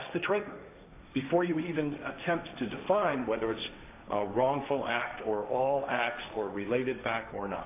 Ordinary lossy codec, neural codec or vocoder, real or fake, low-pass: AAC, 24 kbps; codec, 16 kHz, 1.1 kbps, Voila-Tokenizer; fake; 3.6 kHz